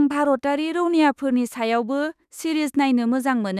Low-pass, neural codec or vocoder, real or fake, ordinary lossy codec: 14.4 kHz; autoencoder, 48 kHz, 32 numbers a frame, DAC-VAE, trained on Japanese speech; fake; none